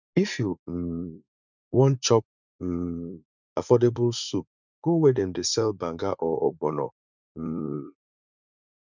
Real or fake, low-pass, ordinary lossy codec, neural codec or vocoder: fake; 7.2 kHz; none; vocoder, 44.1 kHz, 80 mel bands, Vocos